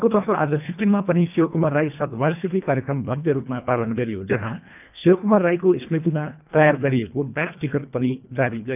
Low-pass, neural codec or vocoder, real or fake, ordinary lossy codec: 3.6 kHz; codec, 24 kHz, 1.5 kbps, HILCodec; fake; none